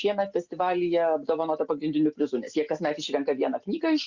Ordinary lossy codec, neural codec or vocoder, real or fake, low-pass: Opus, 64 kbps; none; real; 7.2 kHz